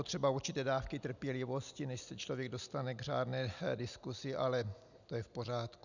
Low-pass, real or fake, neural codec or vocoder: 7.2 kHz; real; none